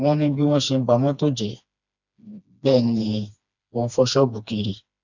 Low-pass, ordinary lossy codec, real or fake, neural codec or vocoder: 7.2 kHz; none; fake; codec, 16 kHz, 2 kbps, FreqCodec, smaller model